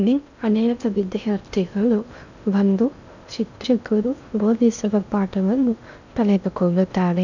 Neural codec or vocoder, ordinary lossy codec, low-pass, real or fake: codec, 16 kHz in and 24 kHz out, 0.6 kbps, FocalCodec, streaming, 2048 codes; none; 7.2 kHz; fake